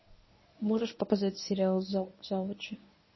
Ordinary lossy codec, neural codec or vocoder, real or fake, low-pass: MP3, 24 kbps; codec, 24 kHz, 0.9 kbps, WavTokenizer, medium speech release version 1; fake; 7.2 kHz